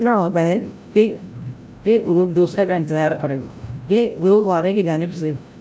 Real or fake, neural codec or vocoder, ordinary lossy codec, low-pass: fake; codec, 16 kHz, 0.5 kbps, FreqCodec, larger model; none; none